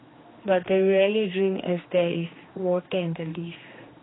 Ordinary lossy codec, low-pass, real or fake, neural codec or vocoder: AAC, 16 kbps; 7.2 kHz; fake; codec, 16 kHz, 2 kbps, X-Codec, HuBERT features, trained on general audio